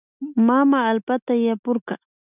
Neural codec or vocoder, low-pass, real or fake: none; 3.6 kHz; real